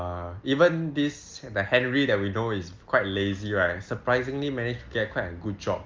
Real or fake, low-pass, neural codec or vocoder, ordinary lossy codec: real; 7.2 kHz; none; Opus, 32 kbps